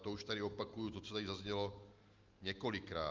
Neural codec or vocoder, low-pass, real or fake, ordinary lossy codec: none; 7.2 kHz; real; Opus, 24 kbps